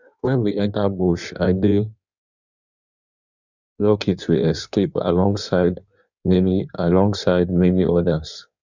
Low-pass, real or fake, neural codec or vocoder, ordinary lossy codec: 7.2 kHz; fake; codec, 16 kHz in and 24 kHz out, 1.1 kbps, FireRedTTS-2 codec; none